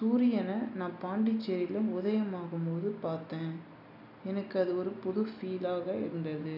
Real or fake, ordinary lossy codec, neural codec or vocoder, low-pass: real; none; none; 5.4 kHz